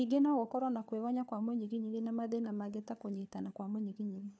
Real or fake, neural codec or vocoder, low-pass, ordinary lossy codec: fake; codec, 16 kHz, 4 kbps, FunCodec, trained on LibriTTS, 50 frames a second; none; none